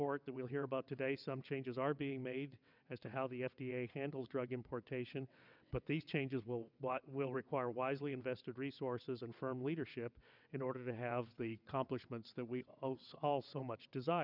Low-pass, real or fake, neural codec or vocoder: 5.4 kHz; fake; vocoder, 22.05 kHz, 80 mel bands, WaveNeXt